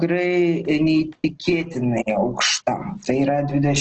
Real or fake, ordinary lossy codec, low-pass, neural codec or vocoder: real; Opus, 16 kbps; 7.2 kHz; none